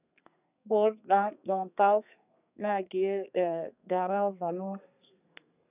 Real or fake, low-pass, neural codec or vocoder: fake; 3.6 kHz; codec, 32 kHz, 1.9 kbps, SNAC